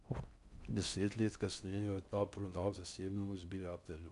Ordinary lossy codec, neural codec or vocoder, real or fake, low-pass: none; codec, 16 kHz in and 24 kHz out, 0.6 kbps, FocalCodec, streaming, 4096 codes; fake; 10.8 kHz